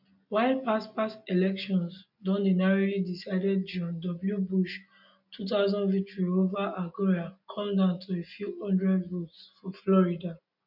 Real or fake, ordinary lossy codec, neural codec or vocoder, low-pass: real; none; none; 5.4 kHz